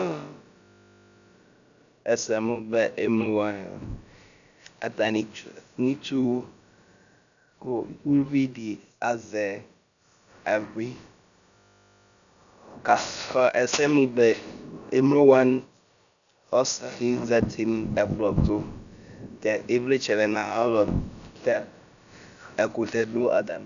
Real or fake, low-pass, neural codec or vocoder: fake; 7.2 kHz; codec, 16 kHz, about 1 kbps, DyCAST, with the encoder's durations